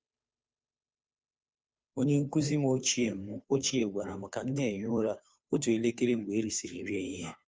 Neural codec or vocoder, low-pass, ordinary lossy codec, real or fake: codec, 16 kHz, 2 kbps, FunCodec, trained on Chinese and English, 25 frames a second; none; none; fake